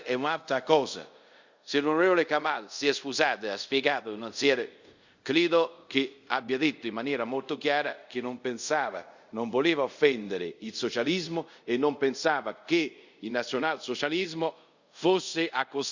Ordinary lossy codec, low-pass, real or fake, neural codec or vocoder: Opus, 64 kbps; 7.2 kHz; fake; codec, 24 kHz, 0.5 kbps, DualCodec